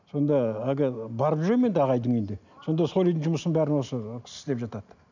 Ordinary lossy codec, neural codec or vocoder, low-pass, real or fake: none; none; 7.2 kHz; real